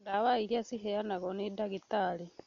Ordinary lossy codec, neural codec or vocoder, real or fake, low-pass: MP3, 48 kbps; none; real; 7.2 kHz